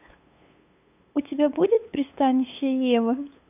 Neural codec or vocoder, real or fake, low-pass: codec, 16 kHz, 8 kbps, FunCodec, trained on LibriTTS, 25 frames a second; fake; 3.6 kHz